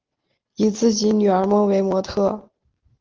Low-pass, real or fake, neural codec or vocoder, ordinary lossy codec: 7.2 kHz; real; none; Opus, 16 kbps